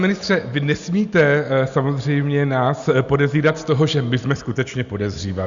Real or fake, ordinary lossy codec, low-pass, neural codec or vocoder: real; Opus, 64 kbps; 7.2 kHz; none